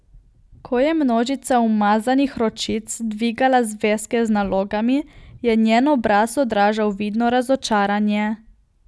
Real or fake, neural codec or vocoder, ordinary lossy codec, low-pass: real; none; none; none